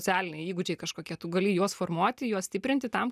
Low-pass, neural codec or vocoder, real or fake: 14.4 kHz; none; real